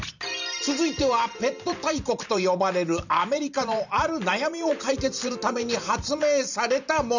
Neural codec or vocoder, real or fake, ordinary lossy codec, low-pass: vocoder, 44.1 kHz, 128 mel bands every 512 samples, BigVGAN v2; fake; none; 7.2 kHz